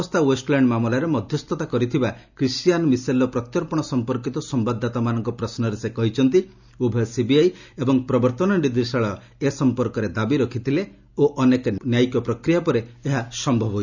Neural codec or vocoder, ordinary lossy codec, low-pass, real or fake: none; none; 7.2 kHz; real